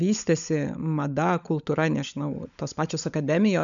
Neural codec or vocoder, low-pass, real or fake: codec, 16 kHz, 16 kbps, FunCodec, trained on LibriTTS, 50 frames a second; 7.2 kHz; fake